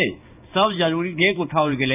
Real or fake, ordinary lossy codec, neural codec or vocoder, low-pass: fake; none; codec, 16 kHz in and 24 kHz out, 1 kbps, XY-Tokenizer; 3.6 kHz